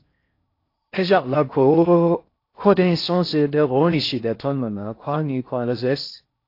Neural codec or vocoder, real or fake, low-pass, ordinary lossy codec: codec, 16 kHz in and 24 kHz out, 0.6 kbps, FocalCodec, streaming, 2048 codes; fake; 5.4 kHz; AAC, 32 kbps